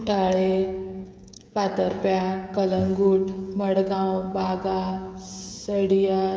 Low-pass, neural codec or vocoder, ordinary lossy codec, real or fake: none; codec, 16 kHz, 16 kbps, FreqCodec, smaller model; none; fake